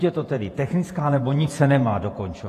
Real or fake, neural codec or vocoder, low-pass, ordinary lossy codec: fake; vocoder, 48 kHz, 128 mel bands, Vocos; 14.4 kHz; AAC, 48 kbps